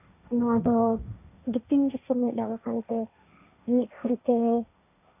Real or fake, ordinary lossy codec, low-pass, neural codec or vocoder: fake; none; 3.6 kHz; codec, 16 kHz, 1.1 kbps, Voila-Tokenizer